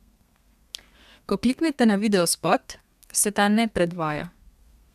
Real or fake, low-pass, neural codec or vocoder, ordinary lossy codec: fake; 14.4 kHz; codec, 32 kHz, 1.9 kbps, SNAC; none